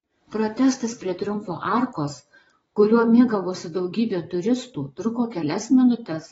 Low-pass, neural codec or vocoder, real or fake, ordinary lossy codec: 9.9 kHz; vocoder, 22.05 kHz, 80 mel bands, Vocos; fake; AAC, 24 kbps